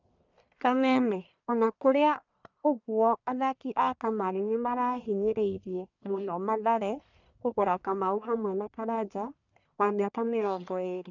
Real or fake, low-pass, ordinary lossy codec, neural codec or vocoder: fake; 7.2 kHz; none; codec, 44.1 kHz, 1.7 kbps, Pupu-Codec